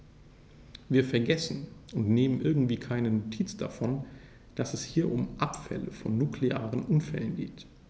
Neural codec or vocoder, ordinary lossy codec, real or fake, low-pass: none; none; real; none